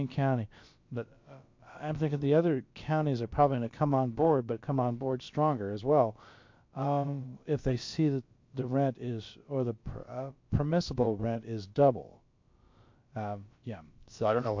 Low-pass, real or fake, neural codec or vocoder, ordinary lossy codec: 7.2 kHz; fake; codec, 16 kHz, about 1 kbps, DyCAST, with the encoder's durations; MP3, 48 kbps